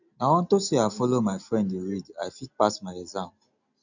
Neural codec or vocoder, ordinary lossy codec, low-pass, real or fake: none; none; 7.2 kHz; real